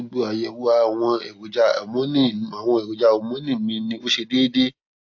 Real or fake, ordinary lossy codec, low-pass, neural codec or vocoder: real; AAC, 48 kbps; 7.2 kHz; none